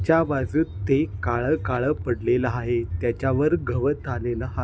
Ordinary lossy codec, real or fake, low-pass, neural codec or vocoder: none; real; none; none